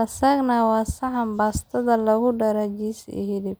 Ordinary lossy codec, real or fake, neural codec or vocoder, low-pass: none; real; none; none